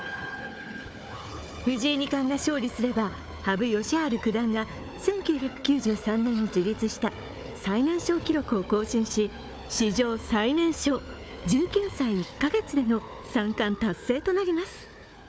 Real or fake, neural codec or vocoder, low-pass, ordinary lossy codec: fake; codec, 16 kHz, 4 kbps, FunCodec, trained on Chinese and English, 50 frames a second; none; none